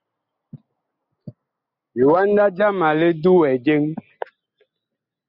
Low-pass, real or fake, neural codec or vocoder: 5.4 kHz; real; none